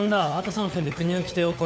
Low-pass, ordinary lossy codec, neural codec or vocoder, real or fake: none; none; codec, 16 kHz, 4 kbps, FunCodec, trained on LibriTTS, 50 frames a second; fake